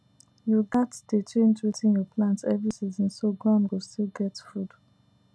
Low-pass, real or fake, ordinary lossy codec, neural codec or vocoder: none; real; none; none